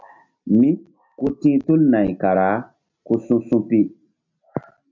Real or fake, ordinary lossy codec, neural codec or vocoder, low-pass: real; MP3, 48 kbps; none; 7.2 kHz